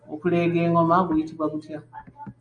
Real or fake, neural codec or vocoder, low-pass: real; none; 9.9 kHz